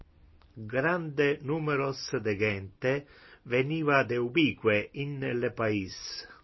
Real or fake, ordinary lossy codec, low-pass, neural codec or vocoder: real; MP3, 24 kbps; 7.2 kHz; none